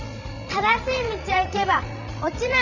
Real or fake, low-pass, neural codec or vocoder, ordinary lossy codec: fake; 7.2 kHz; codec, 16 kHz, 16 kbps, FreqCodec, larger model; none